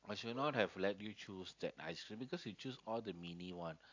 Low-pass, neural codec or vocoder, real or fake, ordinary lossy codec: 7.2 kHz; none; real; AAC, 48 kbps